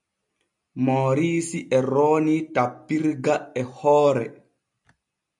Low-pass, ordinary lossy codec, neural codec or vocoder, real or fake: 10.8 kHz; MP3, 96 kbps; none; real